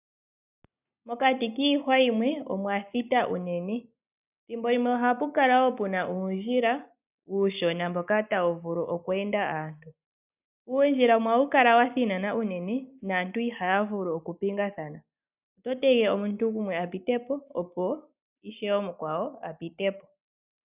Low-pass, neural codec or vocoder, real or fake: 3.6 kHz; none; real